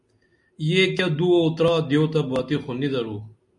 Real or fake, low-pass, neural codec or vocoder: real; 10.8 kHz; none